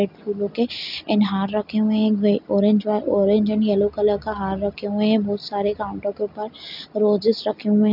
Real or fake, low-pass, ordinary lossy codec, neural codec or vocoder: real; 5.4 kHz; none; none